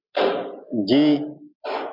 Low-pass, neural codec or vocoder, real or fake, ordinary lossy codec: 5.4 kHz; none; real; MP3, 48 kbps